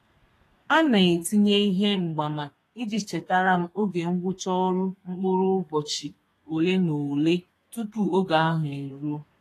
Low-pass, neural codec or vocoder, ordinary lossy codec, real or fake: 14.4 kHz; codec, 32 kHz, 1.9 kbps, SNAC; AAC, 48 kbps; fake